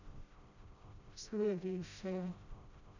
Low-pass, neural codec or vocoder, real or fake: 7.2 kHz; codec, 16 kHz, 0.5 kbps, FreqCodec, smaller model; fake